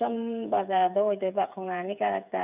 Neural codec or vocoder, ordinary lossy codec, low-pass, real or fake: codec, 16 kHz, 8 kbps, FreqCodec, smaller model; none; 3.6 kHz; fake